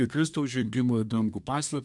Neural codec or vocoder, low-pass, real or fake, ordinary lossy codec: codec, 24 kHz, 1 kbps, SNAC; 10.8 kHz; fake; MP3, 96 kbps